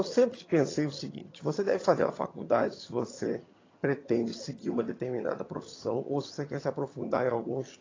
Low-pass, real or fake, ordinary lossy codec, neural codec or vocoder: 7.2 kHz; fake; AAC, 32 kbps; vocoder, 22.05 kHz, 80 mel bands, HiFi-GAN